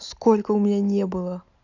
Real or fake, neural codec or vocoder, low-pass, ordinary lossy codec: real; none; 7.2 kHz; none